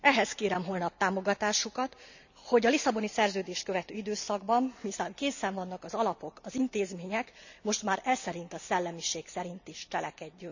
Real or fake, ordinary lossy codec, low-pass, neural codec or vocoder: real; none; 7.2 kHz; none